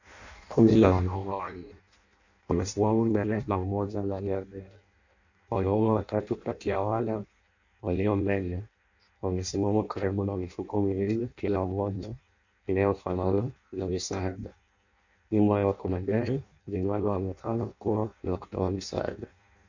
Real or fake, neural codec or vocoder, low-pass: fake; codec, 16 kHz in and 24 kHz out, 0.6 kbps, FireRedTTS-2 codec; 7.2 kHz